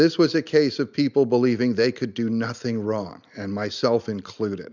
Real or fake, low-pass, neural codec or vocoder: real; 7.2 kHz; none